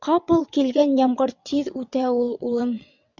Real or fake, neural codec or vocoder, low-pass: fake; vocoder, 22.05 kHz, 80 mel bands, WaveNeXt; 7.2 kHz